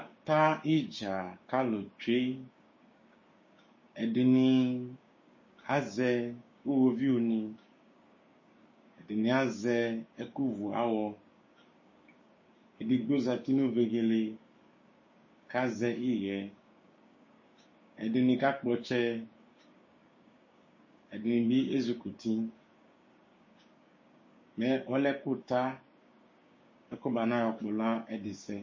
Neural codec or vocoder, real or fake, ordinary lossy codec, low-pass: codec, 16 kHz, 6 kbps, DAC; fake; MP3, 32 kbps; 7.2 kHz